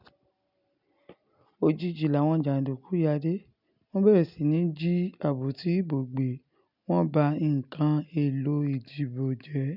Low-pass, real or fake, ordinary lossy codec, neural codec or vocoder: 5.4 kHz; real; none; none